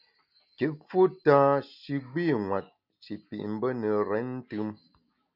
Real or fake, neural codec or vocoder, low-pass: real; none; 5.4 kHz